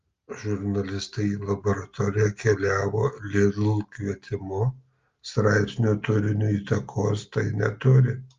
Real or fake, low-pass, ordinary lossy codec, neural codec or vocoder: real; 7.2 kHz; Opus, 32 kbps; none